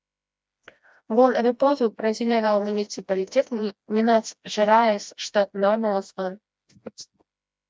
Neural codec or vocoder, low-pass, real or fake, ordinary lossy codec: codec, 16 kHz, 1 kbps, FreqCodec, smaller model; none; fake; none